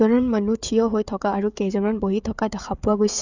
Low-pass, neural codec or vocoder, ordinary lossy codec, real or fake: 7.2 kHz; codec, 16 kHz, 16 kbps, FreqCodec, smaller model; none; fake